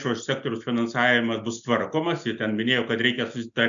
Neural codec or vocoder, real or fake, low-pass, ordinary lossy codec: none; real; 7.2 kHz; MP3, 96 kbps